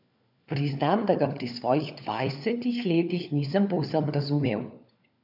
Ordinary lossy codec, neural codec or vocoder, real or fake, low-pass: none; codec, 16 kHz, 4 kbps, FunCodec, trained on LibriTTS, 50 frames a second; fake; 5.4 kHz